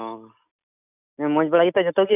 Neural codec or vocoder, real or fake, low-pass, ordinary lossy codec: none; real; 3.6 kHz; none